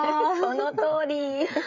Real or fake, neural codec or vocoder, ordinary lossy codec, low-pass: fake; codec, 16 kHz, 16 kbps, FreqCodec, smaller model; none; 7.2 kHz